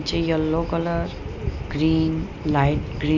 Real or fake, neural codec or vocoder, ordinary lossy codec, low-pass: real; none; none; 7.2 kHz